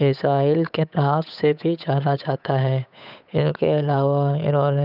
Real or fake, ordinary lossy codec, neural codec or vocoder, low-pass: fake; none; codec, 16 kHz, 8 kbps, FunCodec, trained on Chinese and English, 25 frames a second; 5.4 kHz